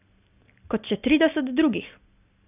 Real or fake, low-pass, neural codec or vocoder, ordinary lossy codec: real; 3.6 kHz; none; none